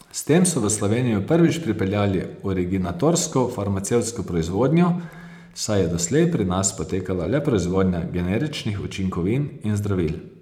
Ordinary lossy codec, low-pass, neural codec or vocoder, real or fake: none; 19.8 kHz; vocoder, 44.1 kHz, 128 mel bands every 512 samples, BigVGAN v2; fake